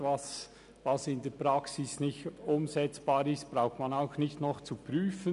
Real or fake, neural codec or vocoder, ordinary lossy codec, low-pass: real; none; none; 10.8 kHz